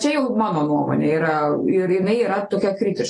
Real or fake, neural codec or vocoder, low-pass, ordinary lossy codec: fake; vocoder, 44.1 kHz, 128 mel bands every 256 samples, BigVGAN v2; 10.8 kHz; AAC, 32 kbps